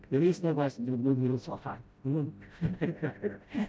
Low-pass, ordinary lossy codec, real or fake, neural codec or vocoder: none; none; fake; codec, 16 kHz, 0.5 kbps, FreqCodec, smaller model